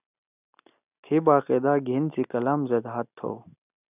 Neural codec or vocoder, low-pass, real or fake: none; 3.6 kHz; real